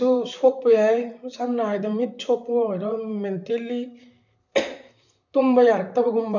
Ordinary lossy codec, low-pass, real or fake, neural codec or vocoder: none; 7.2 kHz; fake; vocoder, 44.1 kHz, 128 mel bands, Pupu-Vocoder